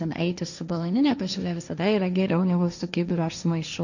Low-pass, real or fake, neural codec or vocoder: 7.2 kHz; fake; codec, 16 kHz, 1.1 kbps, Voila-Tokenizer